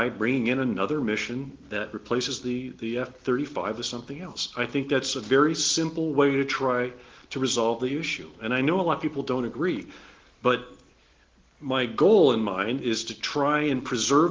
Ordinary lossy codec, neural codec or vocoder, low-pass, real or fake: Opus, 16 kbps; none; 7.2 kHz; real